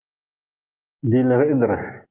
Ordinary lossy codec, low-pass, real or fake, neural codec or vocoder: Opus, 32 kbps; 3.6 kHz; real; none